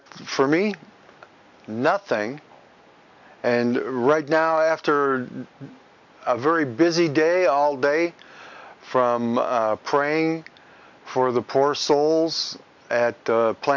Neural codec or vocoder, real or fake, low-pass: none; real; 7.2 kHz